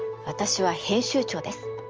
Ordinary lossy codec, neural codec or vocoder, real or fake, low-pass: Opus, 24 kbps; none; real; 7.2 kHz